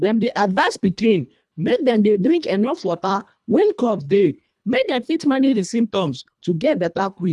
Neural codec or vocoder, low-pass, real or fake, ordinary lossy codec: codec, 24 kHz, 1.5 kbps, HILCodec; none; fake; none